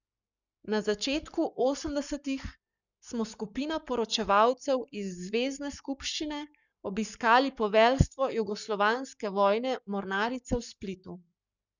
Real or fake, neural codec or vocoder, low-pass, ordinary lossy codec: fake; codec, 44.1 kHz, 7.8 kbps, Pupu-Codec; 7.2 kHz; none